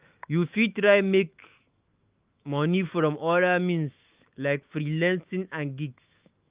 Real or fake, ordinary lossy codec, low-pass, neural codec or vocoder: fake; Opus, 32 kbps; 3.6 kHz; autoencoder, 48 kHz, 128 numbers a frame, DAC-VAE, trained on Japanese speech